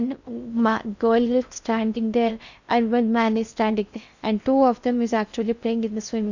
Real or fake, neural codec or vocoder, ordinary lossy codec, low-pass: fake; codec, 16 kHz in and 24 kHz out, 0.6 kbps, FocalCodec, streaming, 4096 codes; none; 7.2 kHz